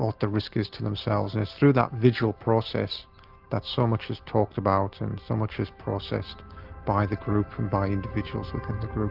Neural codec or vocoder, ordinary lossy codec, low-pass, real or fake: none; Opus, 24 kbps; 5.4 kHz; real